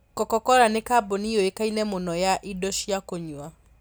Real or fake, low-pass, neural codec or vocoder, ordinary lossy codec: real; none; none; none